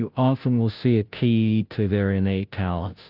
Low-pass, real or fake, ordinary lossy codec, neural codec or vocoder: 5.4 kHz; fake; Opus, 24 kbps; codec, 16 kHz, 0.5 kbps, FunCodec, trained on Chinese and English, 25 frames a second